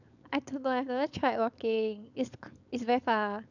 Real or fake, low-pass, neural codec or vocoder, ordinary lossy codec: fake; 7.2 kHz; codec, 16 kHz, 4.8 kbps, FACodec; none